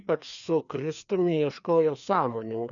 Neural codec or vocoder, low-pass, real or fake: codec, 16 kHz, 2 kbps, FreqCodec, larger model; 7.2 kHz; fake